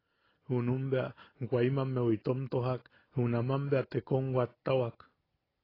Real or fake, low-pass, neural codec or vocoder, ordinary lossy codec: real; 5.4 kHz; none; AAC, 24 kbps